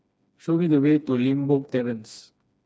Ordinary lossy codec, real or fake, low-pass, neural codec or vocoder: none; fake; none; codec, 16 kHz, 2 kbps, FreqCodec, smaller model